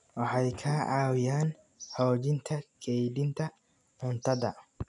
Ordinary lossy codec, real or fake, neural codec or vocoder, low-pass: none; real; none; 10.8 kHz